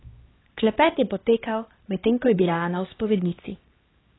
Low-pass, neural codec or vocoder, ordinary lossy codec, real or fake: 7.2 kHz; codec, 16 kHz, 2 kbps, X-Codec, HuBERT features, trained on LibriSpeech; AAC, 16 kbps; fake